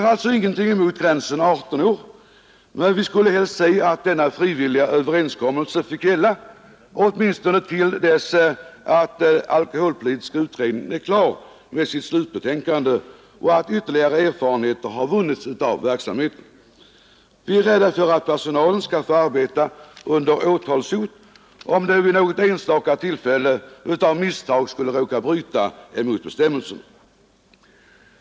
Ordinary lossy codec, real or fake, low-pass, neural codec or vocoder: none; real; none; none